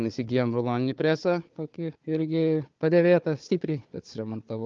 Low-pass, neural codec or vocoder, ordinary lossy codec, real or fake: 7.2 kHz; codec, 16 kHz, 4 kbps, FunCodec, trained on Chinese and English, 50 frames a second; Opus, 24 kbps; fake